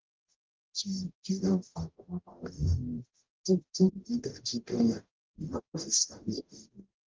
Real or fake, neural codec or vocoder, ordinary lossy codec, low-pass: fake; codec, 44.1 kHz, 0.9 kbps, DAC; Opus, 32 kbps; 7.2 kHz